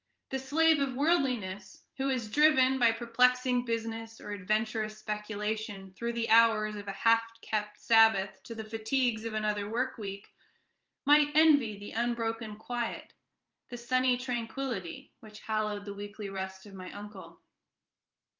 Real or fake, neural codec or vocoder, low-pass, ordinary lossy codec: fake; vocoder, 44.1 kHz, 128 mel bands every 512 samples, BigVGAN v2; 7.2 kHz; Opus, 24 kbps